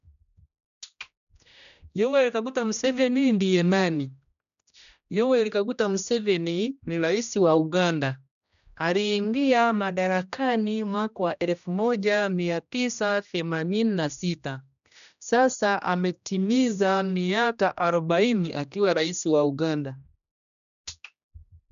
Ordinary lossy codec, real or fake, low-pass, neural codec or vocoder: none; fake; 7.2 kHz; codec, 16 kHz, 1 kbps, X-Codec, HuBERT features, trained on general audio